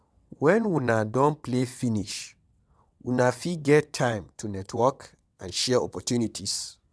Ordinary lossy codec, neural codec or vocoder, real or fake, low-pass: none; vocoder, 22.05 kHz, 80 mel bands, WaveNeXt; fake; none